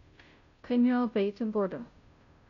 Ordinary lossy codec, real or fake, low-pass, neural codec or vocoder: none; fake; 7.2 kHz; codec, 16 kHz, 0.5 kbps, FunCodec, trained on Chinese and English, 25 frames a second